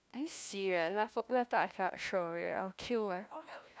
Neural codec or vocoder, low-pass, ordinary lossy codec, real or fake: codec, 16 kHz, 1 kbps, FunCodec, trained on LibriTTS, 50 frames a second; none; none; fake